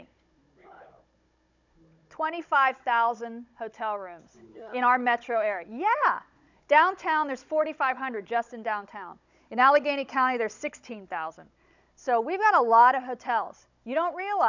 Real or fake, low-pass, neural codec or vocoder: fake; 7.2 kHz; codec, 16 kHz, 16 kbps, FunCodec, trained on Chinese and English, 50 frames a second